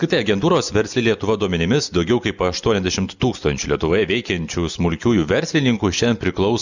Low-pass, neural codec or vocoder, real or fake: 7.2 kHz; none; real